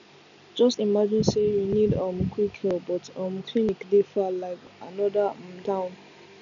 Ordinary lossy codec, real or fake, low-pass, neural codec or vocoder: none; real; 7.2 kHz; none